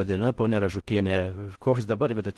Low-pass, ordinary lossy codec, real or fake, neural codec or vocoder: 10.8 kHz; Opus, 16 kbps; fake; codec, 16 kHz in and 24 kHz out, 0.6 kbps, FocalCodec, streaming, 4096 codes